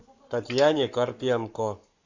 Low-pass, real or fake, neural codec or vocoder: 7.2 kHz; fake; codec, 44.1 kHz, 7.8 kbps, Pupu-Codec